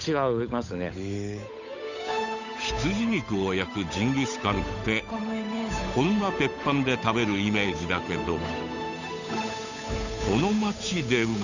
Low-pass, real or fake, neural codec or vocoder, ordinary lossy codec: 7.2 kHz; fake; codec, 16 kHz, 8 kbps, FunCodec, trained on Chinese and English, 25 frames a second; none